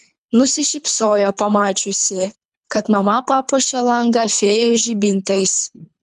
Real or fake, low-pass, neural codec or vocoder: fake; 10.8 kHz; codec, 24 kHz, 3 kbps, HILCodec